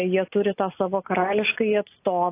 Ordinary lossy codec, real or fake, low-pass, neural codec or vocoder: AAC, 24 kbps; real; 3.6 kHz; none